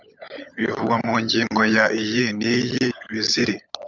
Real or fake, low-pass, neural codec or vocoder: fake; 7.2 kHz; codec, 16 kHz, 16 kbps, FunCodec, trained on LibriTTS, 50 frames a second